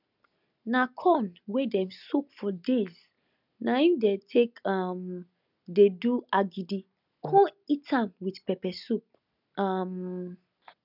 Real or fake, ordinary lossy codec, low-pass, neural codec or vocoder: real; none; 5.4 kHz; none